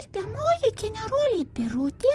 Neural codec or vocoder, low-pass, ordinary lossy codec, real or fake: vocoder, 44.1 kHz, 128 mel bands, Pupu-Vocoder; 10.8 kHz; Opus, 24 kbps; fake